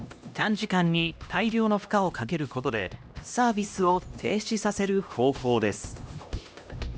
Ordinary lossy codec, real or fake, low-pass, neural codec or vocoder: none; fake; none; codec, 16 kHz, 1 kbps, X-Codec, HuBERT features, trained on LibriSpeech